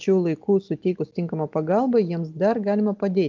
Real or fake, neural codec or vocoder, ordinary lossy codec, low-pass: real; none; Opus, 24 kbps; 7.2 kHz